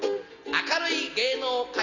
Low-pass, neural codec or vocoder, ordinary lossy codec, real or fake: 7.2 kHz; none; none; real